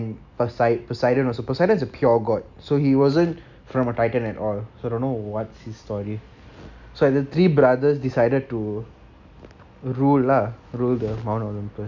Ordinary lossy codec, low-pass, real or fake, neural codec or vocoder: none; 7.2 kHz; real; none